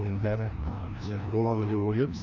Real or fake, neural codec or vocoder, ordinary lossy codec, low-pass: fake; codec, 16 kHz, 1 kbps, FreqCodec, larger model; none; 7.2 kHz